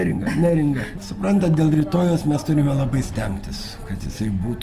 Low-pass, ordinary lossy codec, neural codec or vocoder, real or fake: 14.4 kHz; Opus, 32 kbps; none; real